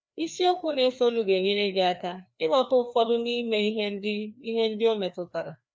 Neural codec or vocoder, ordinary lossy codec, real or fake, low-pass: codec, 16 kHz, 2 kbps, FreqCodec, larger model; none; fake; none